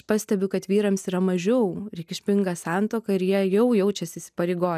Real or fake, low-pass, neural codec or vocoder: real; 14.4 kHz; none